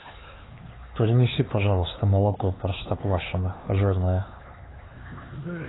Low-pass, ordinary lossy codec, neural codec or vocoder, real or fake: 7.2 kHz; AAC, 16 kbps; codec, 16 kHz, 4 kbps, X-Codec, HuBERT features, trained on LibriSpeech; fake